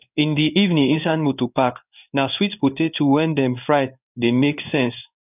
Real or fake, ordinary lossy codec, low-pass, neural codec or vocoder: fake; none; 3.6 kHz; codec, 16 kHz in and 24 kHz out, 1 kbps, XY-Tokenizer